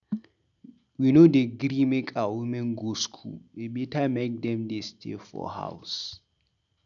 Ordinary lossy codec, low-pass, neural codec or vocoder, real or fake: none; 7.2 kHz; none; real